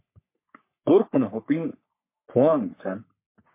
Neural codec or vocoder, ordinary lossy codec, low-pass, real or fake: codec, 44.1 kHz, 3.4 kbps, Pupu-Codec; MP3, 24 kbps; 3.6 kHz; fake